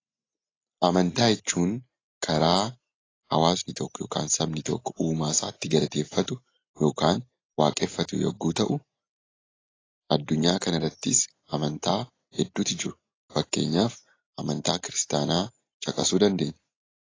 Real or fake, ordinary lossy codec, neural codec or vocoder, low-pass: real; AAC, 32 kbps; none; 7.2 kHz